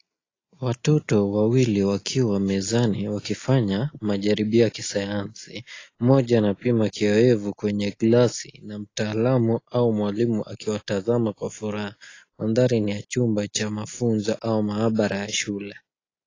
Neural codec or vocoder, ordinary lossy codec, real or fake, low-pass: none; AAC, 32 kbps; real; 7.2 kHz